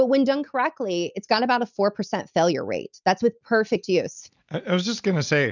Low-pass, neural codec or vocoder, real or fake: 7.2 kHz; none; real